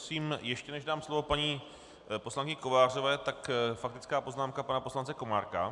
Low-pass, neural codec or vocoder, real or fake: 10.8 kHz; none; real